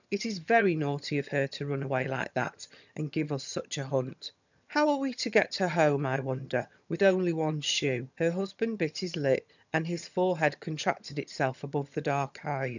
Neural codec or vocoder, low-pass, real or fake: vocoder, 22.05 kHz, 80 mel bands, HiFi-GAN; 7.2 kHz; fake